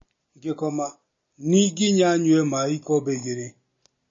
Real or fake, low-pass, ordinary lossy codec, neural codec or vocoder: real; 7.2 kHz; MP3, 32 kbps; none